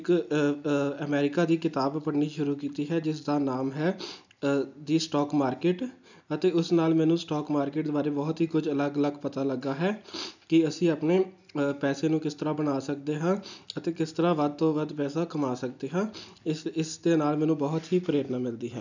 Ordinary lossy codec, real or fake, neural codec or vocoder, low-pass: none; real; none; 7.2 kHz